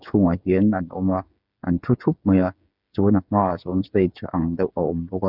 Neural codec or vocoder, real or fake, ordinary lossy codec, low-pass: codec, 16 kHz, 8 kbps, FreqCodec, smaller model; fake; none; 5.4 kHz